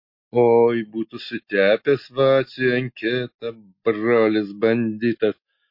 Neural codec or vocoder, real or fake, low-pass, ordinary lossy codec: none; real; 5.4 kHz; MP3, 32 kbps